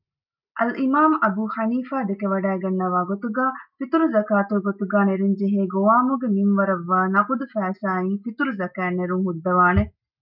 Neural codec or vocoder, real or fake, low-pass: none; real; 5.4 kHz